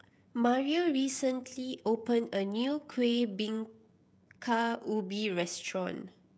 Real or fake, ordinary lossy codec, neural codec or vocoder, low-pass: fake; none; codec, 16 kHz, 16 kbps, FreqCodec, smaller model; none